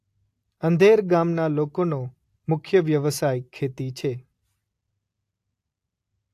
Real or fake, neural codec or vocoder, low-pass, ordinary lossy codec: real; none; 14.4 kHz; AAC, 64 kbps